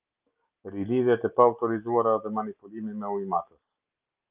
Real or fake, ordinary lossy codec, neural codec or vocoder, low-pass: real; Opus, 32 kbps; none; 3.6 kHz